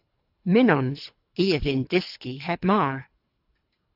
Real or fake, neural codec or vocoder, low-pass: fake; codec, 24 kHz, 3 kbps, HILCodec; 5.4 kHz